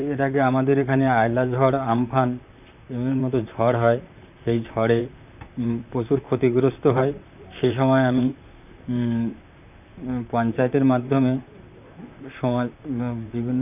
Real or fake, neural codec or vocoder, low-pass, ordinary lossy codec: fake; vocoder, 44.1 kHz, 128 mel bands, Pupu-Vocoder; 3.6 kHz; none